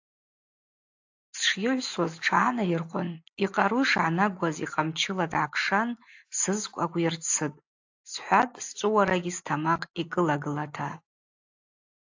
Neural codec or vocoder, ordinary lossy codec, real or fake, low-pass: none; AAC, 48 kbps; real; 7.2 kHz